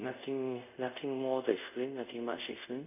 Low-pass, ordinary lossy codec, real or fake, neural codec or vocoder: 3.6 kHz; none; fake; codec, 24 kHz, 0.5 kbps, DualCodec